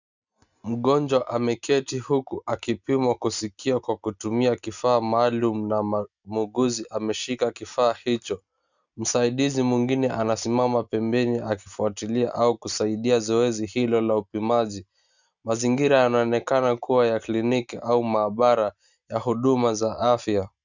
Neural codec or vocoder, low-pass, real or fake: none; 7.2 kHz; real